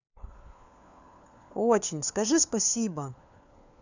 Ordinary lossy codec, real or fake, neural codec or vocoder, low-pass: none; fake; codec, 16 kHz, 4 kbps, FunCodec, trained on LibriTTS, 50 frames a second; 7.2 kHz